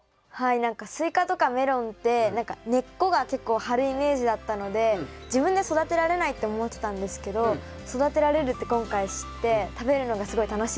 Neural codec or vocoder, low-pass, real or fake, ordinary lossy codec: none; none; real; none